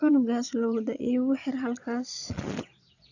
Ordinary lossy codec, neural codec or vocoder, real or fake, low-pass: none; vocoder, 22.05 kHz, 80 mel bands, Vocos; fake; 7.2 kHz